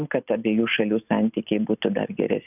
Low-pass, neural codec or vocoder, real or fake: 3.6 kHz; none; real